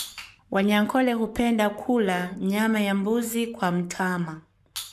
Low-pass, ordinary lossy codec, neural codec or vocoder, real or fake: 14.4 kHz; MP3, 96 kbps; codec, 44.1 kHz, 7.8 kbps, Pupu-Codec; fake